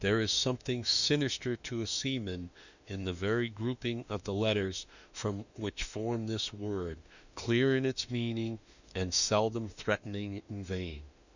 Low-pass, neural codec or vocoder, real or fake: 7.2 kHz; autoencoder, 48 kHz, 32 numbers a frame, DAC-VAE, trained on Japanese speech; fake